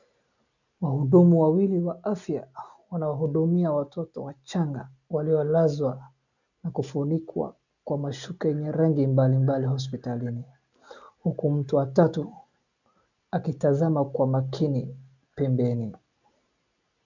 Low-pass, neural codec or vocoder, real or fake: 7.2 kHz; none; real